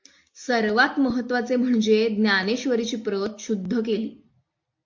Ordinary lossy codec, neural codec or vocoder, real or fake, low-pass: MP3, 48 kbps; none; real; 7.2 kHz